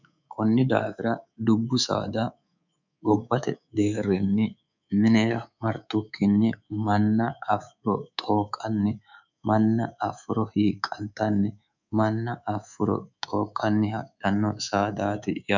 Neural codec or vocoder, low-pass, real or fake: codec, 24 kHz, 3.1 kbps, DualCodec; 7.2 kHz; fake